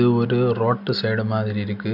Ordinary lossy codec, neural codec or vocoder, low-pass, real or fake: none; none; 5.4 kHz; real